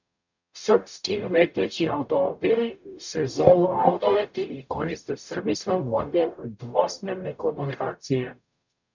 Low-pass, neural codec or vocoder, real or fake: 7.2 kHz; codec, 44.1 kHz, 0.9 kbps, DAC; fake